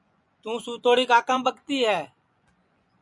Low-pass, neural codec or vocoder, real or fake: 10.8 kHz; vocoder, 24 kHz, 100 mel bands, Vocos; fake